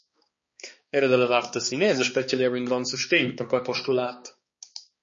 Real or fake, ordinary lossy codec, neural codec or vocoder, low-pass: fake; MP3, 32 kbps; codec, 16 kHz, 2 kbps, X-Codec, HuBERT features, trained on balanced general audio; 7.2 kHz